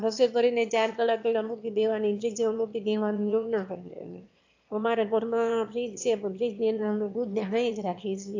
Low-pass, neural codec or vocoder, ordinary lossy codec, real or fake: 7.2 kHz; autoencoder, 22.05 kHz, a latent of 192 numbers a frame, VITS, trained on one speaker; AAC, 48 kbps; fake